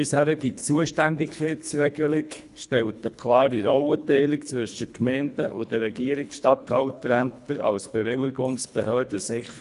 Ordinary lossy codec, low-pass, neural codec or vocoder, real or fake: none; 10.8 kHz; codec, 24 kHz, 1.5 kbps, HILCodec; fake